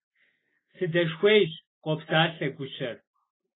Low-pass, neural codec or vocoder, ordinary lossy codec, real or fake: 7.2 kHz; none; AAC, 16 kbps; real